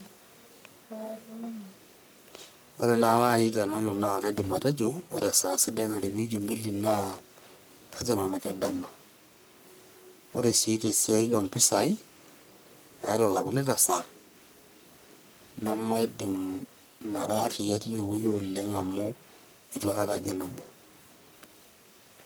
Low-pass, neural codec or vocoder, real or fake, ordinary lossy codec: none; codec, 44.1 kHz, 1.7 kbps, Pupu-Codec; fake; none